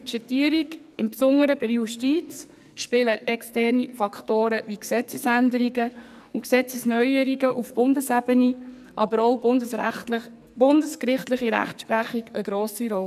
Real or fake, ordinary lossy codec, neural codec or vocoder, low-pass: fake; none; codec, 44.1 kHz, 2.6 kbps, SNAC; 14.4 kHz